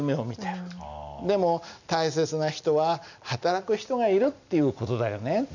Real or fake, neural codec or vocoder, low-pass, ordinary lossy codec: real; none; 7.2 kHz; none